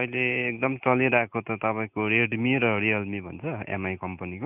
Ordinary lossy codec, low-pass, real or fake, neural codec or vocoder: none; 3.6 kHz; real; none